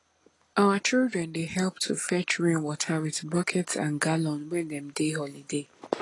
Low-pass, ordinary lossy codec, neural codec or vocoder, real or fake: 10.8 kHz; AAC, 32 kbps; none; real